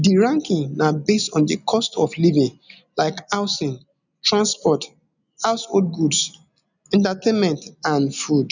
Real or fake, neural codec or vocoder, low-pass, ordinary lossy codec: real; none; 7.2 kHz; none